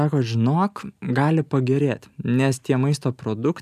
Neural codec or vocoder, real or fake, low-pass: none; real; 14.4 kHz